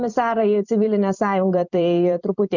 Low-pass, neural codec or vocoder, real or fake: 7.2 kHz; vocoder, 44.1 kHz, 128 mel bands every 256 samples, BigVGAN v2; fake